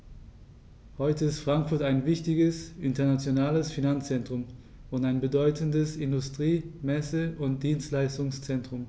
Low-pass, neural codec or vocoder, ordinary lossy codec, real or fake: none; none; none; real